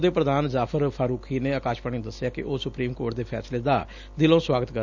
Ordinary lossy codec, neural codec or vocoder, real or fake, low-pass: none; none; real; 7.2 kHz